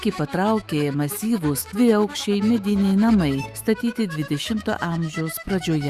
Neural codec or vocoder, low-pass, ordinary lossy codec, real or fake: autoencoder, 48 kHz, 128 numbers a frame, DAC-VAE, trained on Japanese speech; 14.4 kHz; Opus, 64 kbps; fake